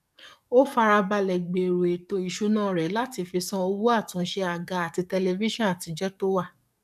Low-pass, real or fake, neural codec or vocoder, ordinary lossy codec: 14.4 kHz; fake; codec, 44.1 kHz, 7.8 kbps, DAC; none